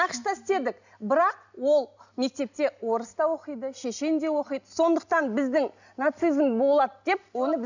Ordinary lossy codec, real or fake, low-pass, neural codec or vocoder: none; real; 7.2 kHz; none